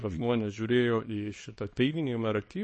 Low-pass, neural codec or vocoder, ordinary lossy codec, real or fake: 10.8 kHz; codec, 24 kHz, 0.9 kbps, WavTokenizer, small release; MP3, 32 kbps; fake